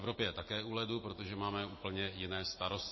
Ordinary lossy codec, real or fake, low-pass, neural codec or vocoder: MP3, 24 kbps; real; 7.2 kHz; none